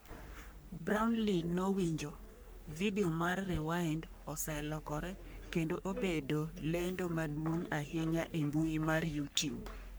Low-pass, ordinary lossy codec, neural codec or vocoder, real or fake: none; none; codec, 44.1 kHz, 3.4 kbps, Pupu-Codec; fake